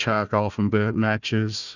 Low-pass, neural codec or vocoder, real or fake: 7.2 kHz; codec, 16 kHz, 1 kbps, FunCodec, trained on Chinese and English, 50 frames a second; fake